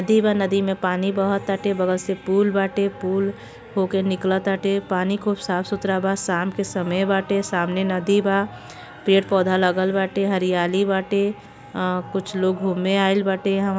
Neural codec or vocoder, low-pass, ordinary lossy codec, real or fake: none; none; none; real